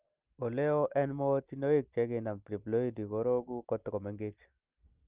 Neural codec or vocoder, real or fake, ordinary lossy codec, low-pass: none; real; Opus, 32 kbps; 3.6 kHz